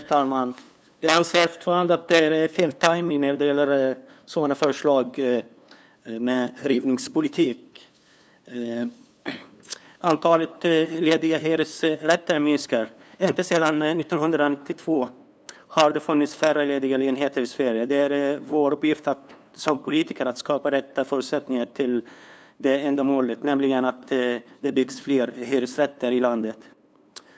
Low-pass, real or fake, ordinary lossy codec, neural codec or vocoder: none; fake; none; codec, 16 kHz, 2 kbps, FunCodec, trained on LibriTTS, 25 frames a second